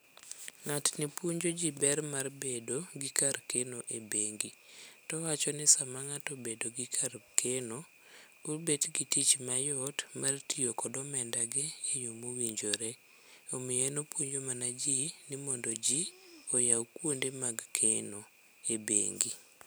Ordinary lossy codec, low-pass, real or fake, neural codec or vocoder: none; none; real; none